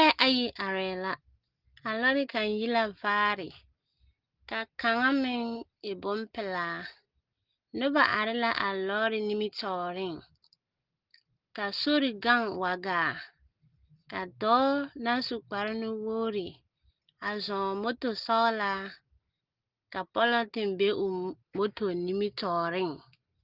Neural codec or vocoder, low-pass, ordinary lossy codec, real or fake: none; 5.4 kHz; Opus, 16 kbps; real